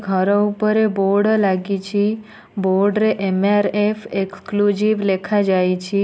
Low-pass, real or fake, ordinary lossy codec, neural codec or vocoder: none; real; none; none